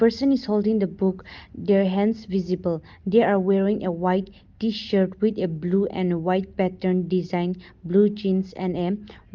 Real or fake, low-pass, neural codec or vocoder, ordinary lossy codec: real; 7.2 kHz; none; Opus, 32 kbps